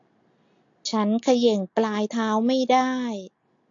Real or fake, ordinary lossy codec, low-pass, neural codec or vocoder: real; AAC, 48 kbps; 7.2 kHz; none